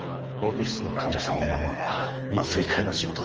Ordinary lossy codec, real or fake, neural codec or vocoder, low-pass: Opus, 24 kbps; fake; codec, 24 kHz, 3 kbps, HILCodec; 7.2 kHz